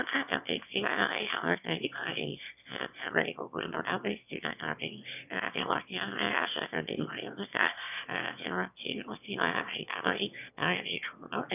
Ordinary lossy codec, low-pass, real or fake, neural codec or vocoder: none; 3.6 kHz; fake; autoencoder, 22.05 kHz, a latent of 192 numbers a frame, VITS, trained on one speaker